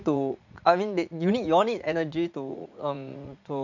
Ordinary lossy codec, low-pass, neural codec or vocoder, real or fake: none; 7.2 kHz; vocoder, 44.1 kHz, 80 mel bands, Vocos; fake